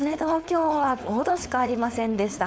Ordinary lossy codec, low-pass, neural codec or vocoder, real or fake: none; none; codec, 16 kHz, 4.8 kbps, FACodec; fake